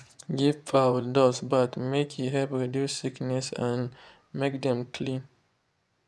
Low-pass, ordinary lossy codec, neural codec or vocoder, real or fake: none; none; vocoder, 24 kHz, 100 mel bands, Vocos; fake